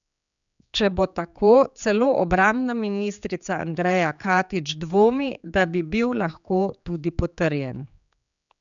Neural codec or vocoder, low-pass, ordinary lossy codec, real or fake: codec, 16 kHz, 4 kbps, X-Codec, HuBERT features, trained on general audio; 7.2 kHz; none; fake